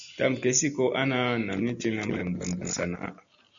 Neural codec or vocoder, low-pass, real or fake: none; 7.2 kHz; real